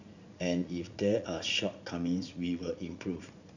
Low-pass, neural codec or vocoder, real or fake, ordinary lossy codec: 7.2 kHz; vocoder, 44.1 kHz, 80 mel bands, Vocos; fake; none